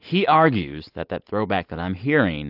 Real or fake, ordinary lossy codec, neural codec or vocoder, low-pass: real; AAC, 48 kbps; none; 5.4 kHz